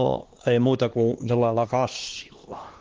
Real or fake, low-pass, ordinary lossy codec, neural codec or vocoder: fake; 7.2 kHz; Opus, 16 kbps; codec, 16 kHz, 2 kbps, X-Codec, HuBERT features, trained on LibriSpeech